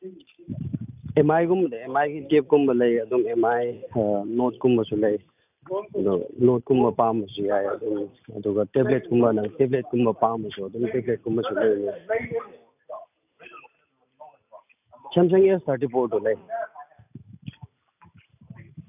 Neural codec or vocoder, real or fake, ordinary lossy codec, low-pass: none; real; none; 3.6 kHz